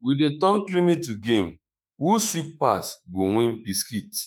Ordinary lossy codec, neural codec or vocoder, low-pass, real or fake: none; autoencoder, 48 kHz, 32 numbers a frame, DAC-VAE, trained on Japanese speech; none; fake